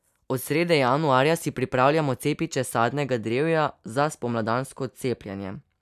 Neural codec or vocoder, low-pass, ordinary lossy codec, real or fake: none; 14.4 kHz; none; real